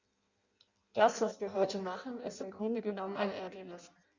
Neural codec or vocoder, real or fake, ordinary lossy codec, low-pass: codec, 16 kHz in and 24 kHz out, 0.6 kbps, FireRedTTS-2 codec; fake; Opus, 64 kbps; 7.2 kHz